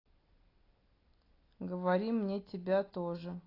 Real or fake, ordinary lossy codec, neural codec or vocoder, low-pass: real; AAC, 24 kbps; none; 5.4 kHz